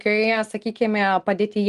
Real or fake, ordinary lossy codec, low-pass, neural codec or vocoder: real; Opus, 24 kbps; 10.8 kHz; none